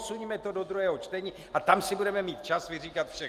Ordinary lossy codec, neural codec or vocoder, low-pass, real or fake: Opus, 32 kbps; none; 14.4 kHz; real